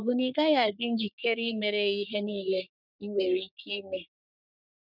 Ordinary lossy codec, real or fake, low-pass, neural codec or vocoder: none; fake; 5.4 kHz; codec, 44.1 kHz, 3.4 kbps, Pupu-Codec